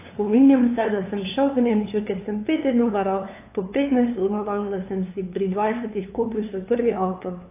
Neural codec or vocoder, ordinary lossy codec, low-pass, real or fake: codec, 16 kHz, 2 kbps, FunCodec, trained on LibriTTS, 25 frames a second; AAC, 24 kbps; 3.6 kHz; fake